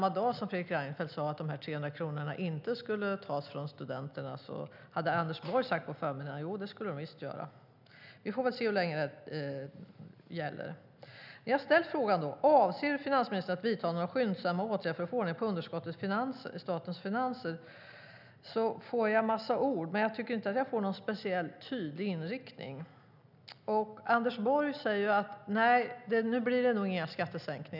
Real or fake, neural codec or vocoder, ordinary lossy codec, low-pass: real; none; none; 5.4 kHz